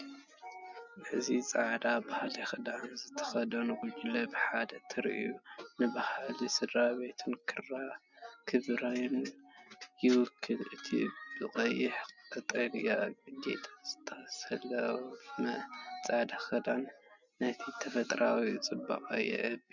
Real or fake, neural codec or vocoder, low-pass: real; none; 7.2 kHz